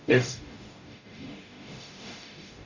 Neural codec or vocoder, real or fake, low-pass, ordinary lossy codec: codec, 44.1 kHz, 0.9 kbps, DAC; fake; 7.2 kHz; none